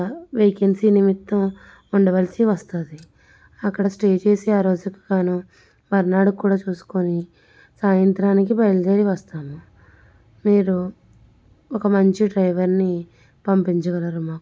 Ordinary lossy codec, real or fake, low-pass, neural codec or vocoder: none; real; none; none